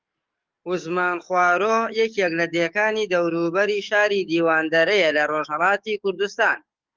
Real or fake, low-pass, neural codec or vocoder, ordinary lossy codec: fake; 7.2 kHz; codec, 44.1 kHz, 7.8 kbps, DAC; Opus, 32 kbps